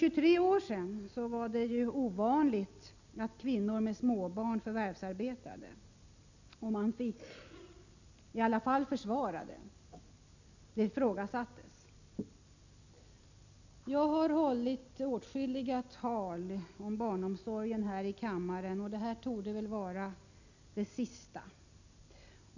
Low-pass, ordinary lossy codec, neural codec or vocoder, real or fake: 7.2 kHz; none; none; real